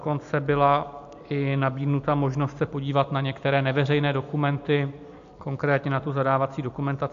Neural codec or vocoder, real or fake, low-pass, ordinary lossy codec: none; real; 7.2 kHz; AAC, 64 kbps